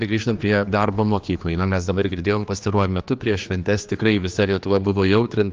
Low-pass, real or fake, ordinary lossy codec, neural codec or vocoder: 7.2 kHz; fake; Opus, 24 kbps; codec, 16 kHz, 2 kbps, X-Codec, HuBERT features, trained on general audio